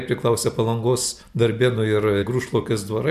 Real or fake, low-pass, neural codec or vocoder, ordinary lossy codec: real; 14.4 kHz; none; MP3, 96 kbps